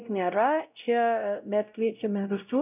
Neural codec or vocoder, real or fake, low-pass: codec, 16 kHz, 0.5 kbps, X-Codec, WavLM features, trained on Multilingual LibriSpeech; fake; 3.6 kHz